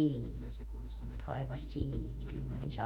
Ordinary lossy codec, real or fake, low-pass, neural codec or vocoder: none; fake; 19.8 kHz; autoencoder, 48 kHz, 32 numbers a frame, DAC-VAE, trained on Japanese speech